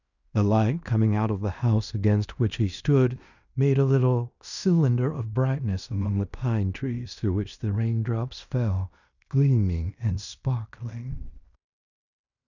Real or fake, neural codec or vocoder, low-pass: fake; codec, 16 kHz in and 24 kHz out, 0.9 kbps, LongCat-Audio-Codec, fine tuned four codebook decoder; 7.2 kHz